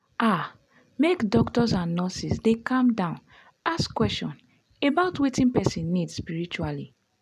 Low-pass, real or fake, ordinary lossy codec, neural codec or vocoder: 14.4 kHz; real; none; none